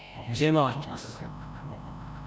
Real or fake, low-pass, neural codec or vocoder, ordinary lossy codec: fake; none; codec, 16 kHz, 0.5 kbps, FreqCodec, larger model; none